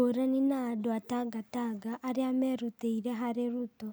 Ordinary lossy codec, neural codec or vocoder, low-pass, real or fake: none; none; none; real